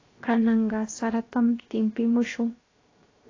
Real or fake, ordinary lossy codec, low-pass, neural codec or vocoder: fake; AAC, 32 kbps; 7.2 kHz; codec, 16 kHz, 0.7 kbps, FocalCodec